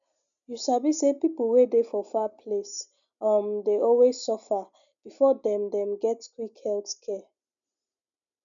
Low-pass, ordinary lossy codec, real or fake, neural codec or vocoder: 7.2 kHz; none; real; none